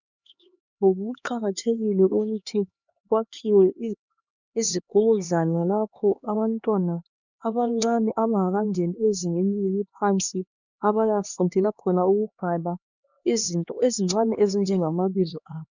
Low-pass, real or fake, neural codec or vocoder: 7.2 kHz; fake; codec, 16 kHz, 2 kbps, X-Codec, HuBERT features, trained on LibriSpeech